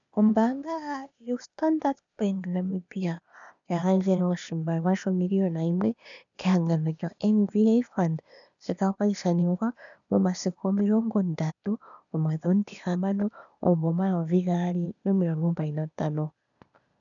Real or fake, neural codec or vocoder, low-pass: fake; codec, 16 kHz, 0.8 kbps, ZipCodec; 7.2 kHz